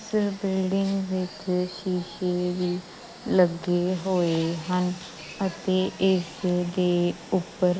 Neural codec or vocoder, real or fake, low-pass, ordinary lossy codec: none; real; none; none